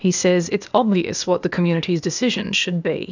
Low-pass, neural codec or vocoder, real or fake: 7.2 kHz; codec, 16 kHz, 0.8 kbps, ZipCodec; fake